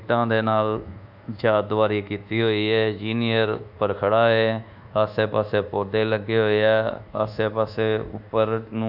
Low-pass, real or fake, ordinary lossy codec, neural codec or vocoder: 5.4 kHz; fake; none; codec, 24 kHz, 1.2 kbps, DualCodec